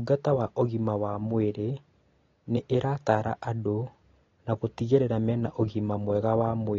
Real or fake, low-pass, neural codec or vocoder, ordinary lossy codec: real; 7.2 kHz; none; AAC, 32 kbps